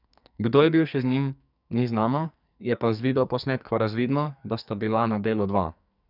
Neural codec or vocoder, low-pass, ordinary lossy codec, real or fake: codec, 44.1 kHz, 2.6 kbps, SNAC; 5.4 kHz; none; fake